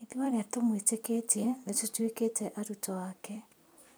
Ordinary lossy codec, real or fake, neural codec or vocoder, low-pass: none; fake; vocoder, 44.1 kHz, 128 mel bands every 256 samples, BigVGAN v2; none